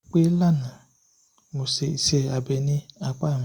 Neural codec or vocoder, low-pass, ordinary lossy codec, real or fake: none; 19.8 kHz; Opus, 64 kbps; real